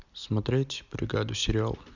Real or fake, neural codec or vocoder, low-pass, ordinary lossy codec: real; none; 7.2 kHz; none